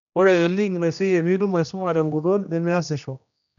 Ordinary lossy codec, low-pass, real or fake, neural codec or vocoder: none; 7.2 kHz; fake; codec, 16 kHz, 1 kbps, X-Codec, HuBERT features, trained on general audio